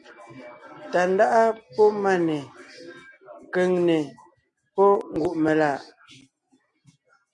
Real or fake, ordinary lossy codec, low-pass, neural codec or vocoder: real; MP3, 48 kbps; 10.8 kHz; none